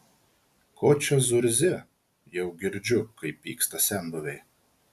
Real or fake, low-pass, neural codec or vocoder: real; 14.4 kHz; none